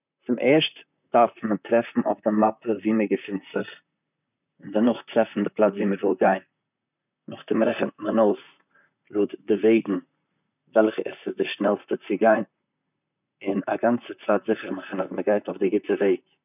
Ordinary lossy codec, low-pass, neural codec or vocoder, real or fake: none; 3.6 kHz; vocoder, 44.1 kHz, 128 mel bands, Pupu-Vocoder; fake